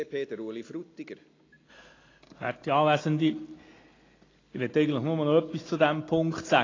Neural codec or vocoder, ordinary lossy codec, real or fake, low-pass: none; AAC, 32 kbps; real; 7.2 kHz